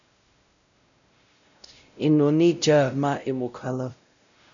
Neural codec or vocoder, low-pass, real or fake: codec, 16 kHz, 0.5 kbps, X-Codec, WavLM features, trained on Multilingual LibriSpeech; 7.2 kHz; fake